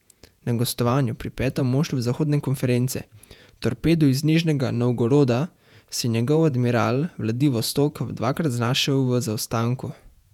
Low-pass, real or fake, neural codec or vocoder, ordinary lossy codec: 19.8 kHz; fake; vocoder, 48 kHz, 128 mel bands, Vocos; none